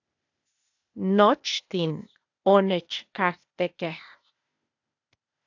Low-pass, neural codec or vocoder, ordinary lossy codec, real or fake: 7.2 kHz; codec, 16 kHz, 0.8 kbps, ZipCodec; AAC, 48 kbps; fake